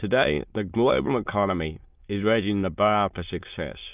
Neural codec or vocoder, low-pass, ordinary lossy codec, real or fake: autoencoder, 22.05 kHz, a latent of 192 numbers a frame, VITS, trained on many speakers; 3.6 kHz; Opus, 32 kbps; fake